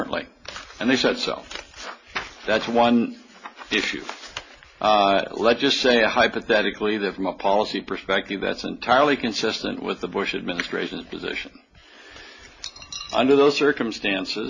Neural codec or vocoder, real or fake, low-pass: none; real; 7.2 kHz